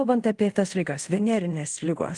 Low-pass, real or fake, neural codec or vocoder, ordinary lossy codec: 10.8 kHz; fake; codec, 24 kHz, 0.5 kbps, DualCodec; Opus, 24 kbps